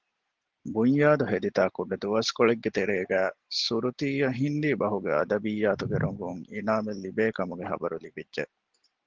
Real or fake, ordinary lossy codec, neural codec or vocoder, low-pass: real; Opus, 16 kbps; none; 7.2 kHz